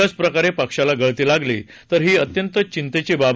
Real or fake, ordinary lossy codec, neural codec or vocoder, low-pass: real; none; none; none